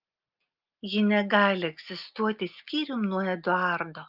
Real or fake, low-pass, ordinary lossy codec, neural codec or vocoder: real; 5.4 kHz; Opus, 24 kbps; none